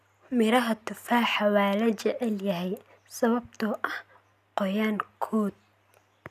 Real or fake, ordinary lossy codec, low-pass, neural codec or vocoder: real; none; 14.4 kHz; none